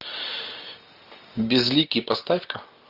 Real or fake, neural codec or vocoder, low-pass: real; none; 5.4 kHz